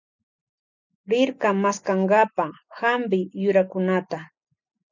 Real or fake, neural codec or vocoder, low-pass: real; none; 7.2 kHz